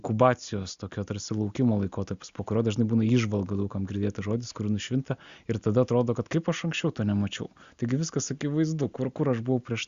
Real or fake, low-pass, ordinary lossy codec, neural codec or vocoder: real; 7.2 kHz; Opus, 64 kbps; none